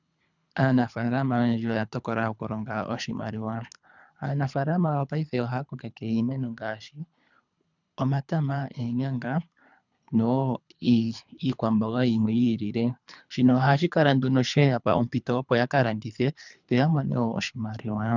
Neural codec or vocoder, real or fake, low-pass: codec, 24 kHz, 3 kbps, HILCodec; fake; 7.2 kHz